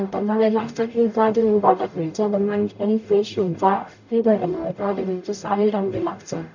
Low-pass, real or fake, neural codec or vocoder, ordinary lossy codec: 7.2 kHz; fake; codec, 44.1 kHz, 0.9 kbps, DAC; none